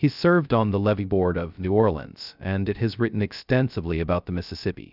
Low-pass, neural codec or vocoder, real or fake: 5.4 kHz; codec, 16 kHz, 0.2 kbps, FocalCodec; fake